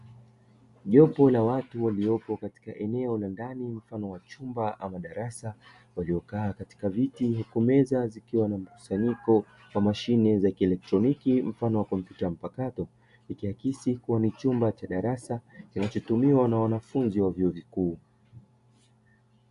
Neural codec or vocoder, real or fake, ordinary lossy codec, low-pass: none; real; AAC, 96 kbps; 10.8 kHz